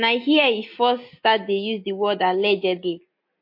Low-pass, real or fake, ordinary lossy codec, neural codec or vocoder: 5.4 kHz; real; MP3, 32 kbps; none